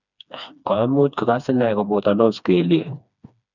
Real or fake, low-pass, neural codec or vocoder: fake; 7.2 kHz; codec, 16 kHz, 2 kbps, FreqCodec, smaller model